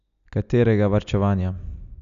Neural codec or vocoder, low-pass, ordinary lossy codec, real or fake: none; 7.2 kHz; none; real